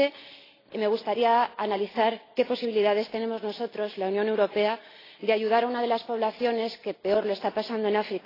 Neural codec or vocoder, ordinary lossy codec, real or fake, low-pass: none; AAC, 24 kbps; real; 5.4 kHz